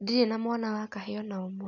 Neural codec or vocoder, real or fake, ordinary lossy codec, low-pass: none; real; none; 7.2 kHz